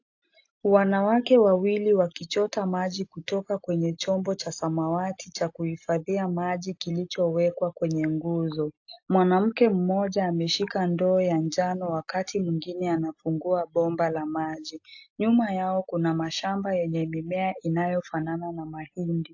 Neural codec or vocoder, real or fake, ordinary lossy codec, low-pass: none; real; AAC, 48 kbps; 7.2 kHz